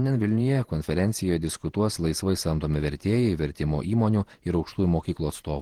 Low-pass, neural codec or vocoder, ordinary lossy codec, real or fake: 19.8 kHz; vocoder, 48 kHz, 128 mel bands, Vocos; Opus, 16 kbps; fake